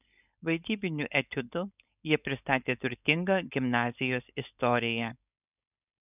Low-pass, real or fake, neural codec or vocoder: 3.6 kHz; fake; codec, 16 kHz, 4.8 kbps, FACodec